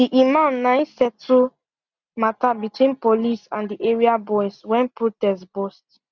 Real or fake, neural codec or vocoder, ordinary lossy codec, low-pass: real; none; Opus, 64 kbps; 7.2 kHz